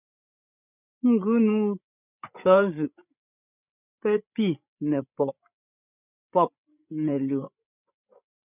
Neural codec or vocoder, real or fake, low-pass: codec, 16 kHz, 8 kbps, FreqCodec, larger model; fake; 3.6 kHz